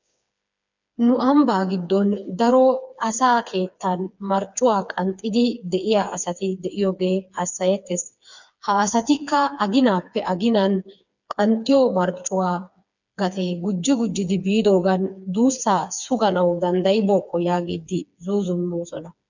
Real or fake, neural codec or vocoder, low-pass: fake; codec, 16 kHz, 4 kbps, FreqCodec, smaller model; 7.2 kHz